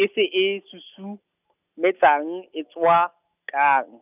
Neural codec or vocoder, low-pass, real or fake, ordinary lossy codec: none; 3.6 kHz; real; none